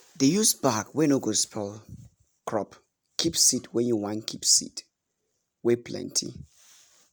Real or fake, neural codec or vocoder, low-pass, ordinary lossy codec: real; none; none; none